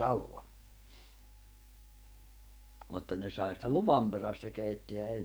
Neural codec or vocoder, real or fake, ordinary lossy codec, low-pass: codec, 44.1 kHz, 2.6 kbps, SNAC; fake; none; none